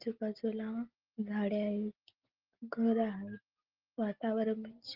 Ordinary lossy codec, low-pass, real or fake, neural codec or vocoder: Opus, 16 kbps; 5.4 kHz; real; none